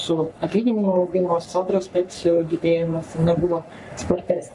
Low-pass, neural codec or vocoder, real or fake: 10.8 kHz; codec, 44.1 kHz, 3.4 kbps, Pupu-Codec; fake